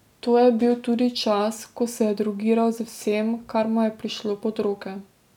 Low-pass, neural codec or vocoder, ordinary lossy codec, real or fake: 19.8 kHz; none; none; real